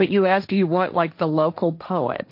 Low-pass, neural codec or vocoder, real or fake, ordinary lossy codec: 5.4 kHz; codec, 16 kHz, 1.1 kbps, Voila-Tokenizer; fake; MP3, 32 kbps